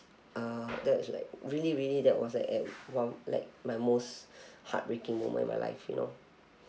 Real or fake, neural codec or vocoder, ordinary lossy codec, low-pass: real; none; none; none